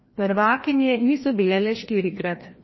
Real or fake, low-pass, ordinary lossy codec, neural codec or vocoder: fake; 7.2 kHz; MP3, 24 kbps; codec, 16 kHz, 1 kbps, FreqCodec, larger model